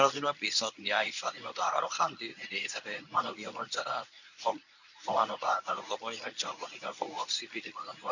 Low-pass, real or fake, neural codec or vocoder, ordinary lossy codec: 7.2 kHz; fake; codec, 24 kHz, 0.9 kbps, WavTokenizer, medium speech release version 1; none